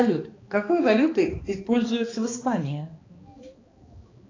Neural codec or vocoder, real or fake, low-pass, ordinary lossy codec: codec, 16 kHz, 2 kbps, X-Codec, HuBERT features, trained on balanced general audio; fake; 7.2 kHz; AAC, 32 kbps